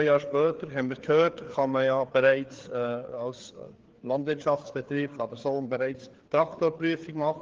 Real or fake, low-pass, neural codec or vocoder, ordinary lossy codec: fake; 7.2 kHz; codec, 16 kHz, 4 kbps, FreqCodec, larger model; Opus, 16 kbps